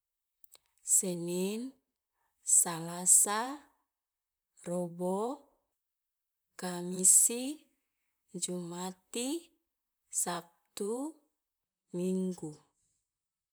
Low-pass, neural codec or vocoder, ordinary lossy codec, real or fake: none; vocoder, 44.1 kHz, 128 mel bands, Pupu-Vocoder; none; fake